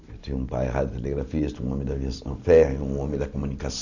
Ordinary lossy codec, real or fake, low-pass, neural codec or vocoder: none; real; 7.2 kHz; none